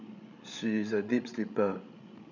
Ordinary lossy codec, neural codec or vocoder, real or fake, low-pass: none; codec, 16 kHz, 16 kbps, FreqCodec, larger model; fake; 7.2 kHz